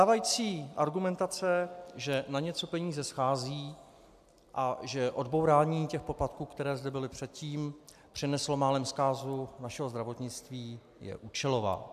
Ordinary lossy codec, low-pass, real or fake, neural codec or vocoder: AAC, 96 kbps; 14.4 kHz; real; none